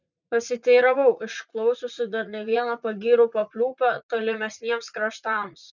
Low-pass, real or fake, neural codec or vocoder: 7.2 kHz; fake; vocoder, 44.1 kHz, 80 mel bands, Vocos